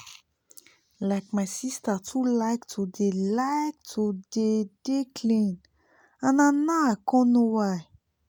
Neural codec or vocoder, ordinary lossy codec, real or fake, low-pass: none; none; real; none